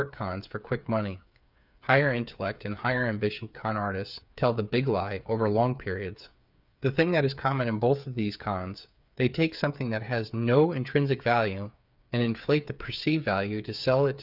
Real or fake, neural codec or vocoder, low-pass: fake; codec, 16 kHz, 8 kbps, FreqCodec, smaller model; 5.4 kHz